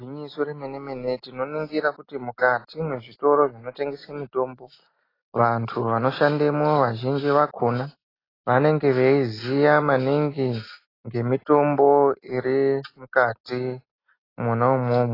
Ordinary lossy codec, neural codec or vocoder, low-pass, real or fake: AAC, 24 kbps; none; 5.4 kHz; real